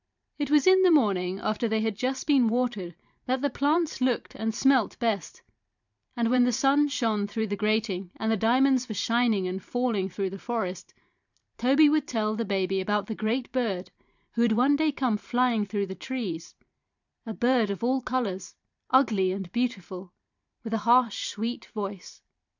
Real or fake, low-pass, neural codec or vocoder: fake; 7.2 kHz; vocoder, 44.1 kHz, 128 mel bands every 512 samples, BigVGAN v2